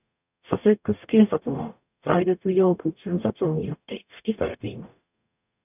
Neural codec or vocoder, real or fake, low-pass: codec, 44.1 kHz, 0.9 kbps, DAC; fake; 3.6 kHz